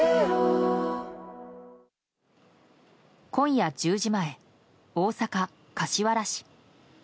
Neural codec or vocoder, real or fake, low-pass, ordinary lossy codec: none; real; none; none